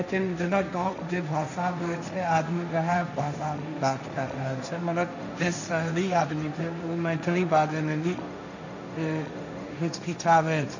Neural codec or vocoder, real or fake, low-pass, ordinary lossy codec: codec, 16 kHz, 1.1 kbps, Voila-Tokenizer; fake; 7.2 kHz; none